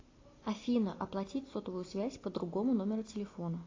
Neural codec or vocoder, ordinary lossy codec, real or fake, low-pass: none; AAC, 32 kbps; real; 7.2 kHz